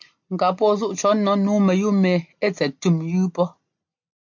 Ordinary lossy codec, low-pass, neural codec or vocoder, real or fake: MP3, 48 kbps; 7.2 kHz; none; real